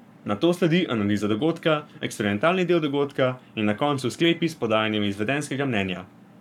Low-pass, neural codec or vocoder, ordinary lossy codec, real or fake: 19.8 kHz; codec, 44.1 kHz, 7.8 kbps, Pupu-Codec; none; fake